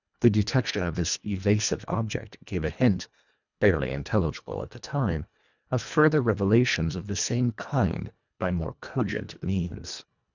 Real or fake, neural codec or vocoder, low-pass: fake; codec, 24 kHz, 1.5 kbps, HILCodec; 7.2 kHz